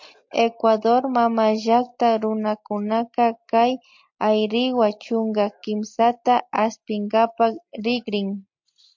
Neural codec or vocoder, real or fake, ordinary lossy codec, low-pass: none; real; MP3, 48 kbps; 7.2 kHz